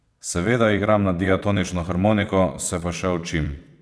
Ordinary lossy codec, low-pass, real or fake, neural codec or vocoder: none; none; fake; vocoder, 22.05 kHz, 80 mel bands, WaveNeXt